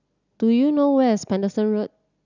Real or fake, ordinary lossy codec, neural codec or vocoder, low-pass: real; none; none; 7.2 kHz